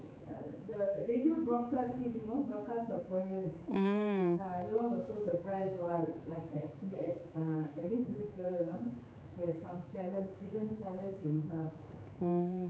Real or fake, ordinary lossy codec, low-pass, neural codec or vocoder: fake; none; none; codec, 16 kHz, 4 kbps, X-Codec, HuBERT features, trained on general audio